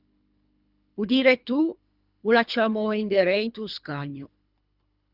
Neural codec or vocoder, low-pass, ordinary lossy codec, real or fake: codec, 24 kHz, 3 kbps, HILCodec; 5.4 kHz; Opus, 64 kbps; fake